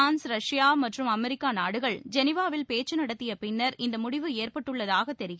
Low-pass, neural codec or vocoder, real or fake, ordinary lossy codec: none; none; real; none